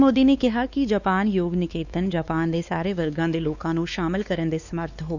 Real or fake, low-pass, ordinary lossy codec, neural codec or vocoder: fake; 7.2 kHz; none; codec, 16 kHz, 2 kbps, X-Codec, WavLM features, trained on Multilingual LibriSpeech